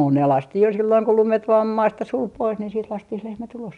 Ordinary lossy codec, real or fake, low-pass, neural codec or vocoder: none; real; 10.8 kHz; none